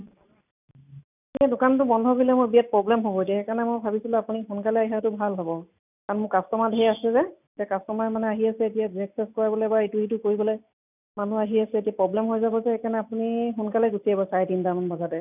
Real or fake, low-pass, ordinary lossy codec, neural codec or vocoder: real; 3.6 kHz; none; none